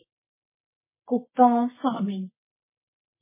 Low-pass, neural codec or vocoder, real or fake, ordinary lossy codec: 3.6 kHz; codec, 24 kHz, 0.9 kbps, WavTokenizer, medium music audio release; fake; MP3, 16 kbps